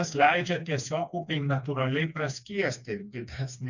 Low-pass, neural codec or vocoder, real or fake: 7.2 kHz; codec, 16 kHz, 2 kbps, FreqCodec, smaller model; fake